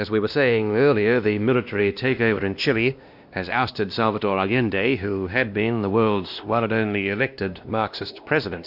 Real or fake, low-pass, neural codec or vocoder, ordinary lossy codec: fake; 5.4 kHz; codec, 16 kHz, 1 kbps, X-Codec, WavLM features, trained on Multilingual LibriSpeech; AAC, 48 kbps